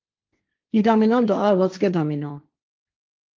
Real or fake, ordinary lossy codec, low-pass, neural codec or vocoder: fake; Opus, 24 kbps; 7.2 kHz; codec, 16 kHz, 1.1 kbps, Voila-Tokenizer